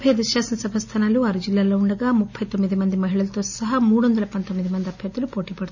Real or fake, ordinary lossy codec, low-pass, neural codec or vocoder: real; none; 7.2 kHz; none